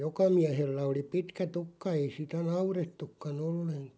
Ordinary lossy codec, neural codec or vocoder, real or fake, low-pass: none; none; real; none